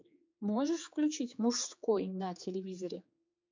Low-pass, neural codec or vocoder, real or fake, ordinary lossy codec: 7.2 kHz; codec, 16 kHz, 4 kbps, X-Codec, HuBERT features, trained on general audio; fake; MP3, 64 kbps